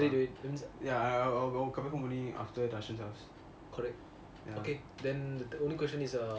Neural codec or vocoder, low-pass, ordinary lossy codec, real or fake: none; none; none; real